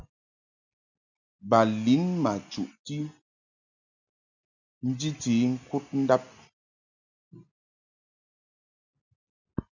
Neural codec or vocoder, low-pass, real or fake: none; 7.2 kHz; real